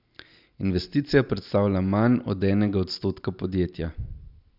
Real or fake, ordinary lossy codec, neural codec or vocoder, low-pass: real; none; none; 5.4 kHz